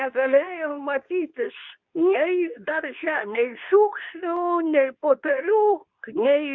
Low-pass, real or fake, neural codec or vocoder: 7.2 kHz; fake; codec, 24 kHz, 0.9 kbps, WavTokenizer, medium speech release version 2